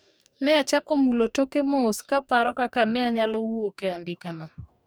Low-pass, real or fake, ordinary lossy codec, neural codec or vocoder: none; fake; none; codec, 44.1 kHz, 2.6 kbps, DAC